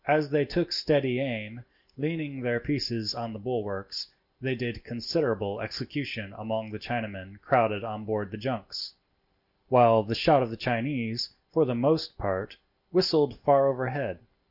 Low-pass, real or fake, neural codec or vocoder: 5.4 kHz; real; none